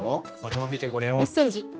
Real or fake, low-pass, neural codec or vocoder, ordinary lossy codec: fake; none; codec, 16 kHz, 1 kbps, X-Codec, HuBERT features, trained on general audio; none